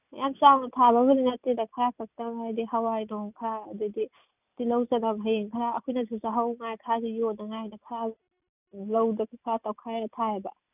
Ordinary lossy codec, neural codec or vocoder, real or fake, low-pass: none; none; real; 3.6 kHz